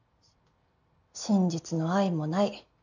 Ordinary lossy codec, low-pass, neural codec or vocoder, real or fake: none; 7.2 kHz; none; real